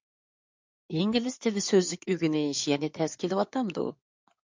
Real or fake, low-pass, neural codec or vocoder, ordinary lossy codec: fake; 7.2 kHz; codec, 16 kHz in and 24 kHz out, 2.2 kbps, FireRedTTS-2 codec; MP3, 64 kbps